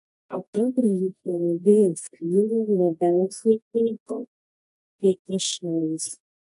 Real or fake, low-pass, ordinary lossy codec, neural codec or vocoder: fake; 10.8 kHz; AAC, 96 kbps; codec, 24 kHz, 0.9 kbps, WavTokenizer, medium music audio release